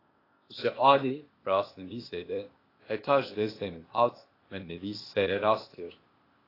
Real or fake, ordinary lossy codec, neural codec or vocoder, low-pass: fake; AAC, 24 kbps; codec, 16 kHz, 0.8 kbps, ZipCodec; 5.4 kHz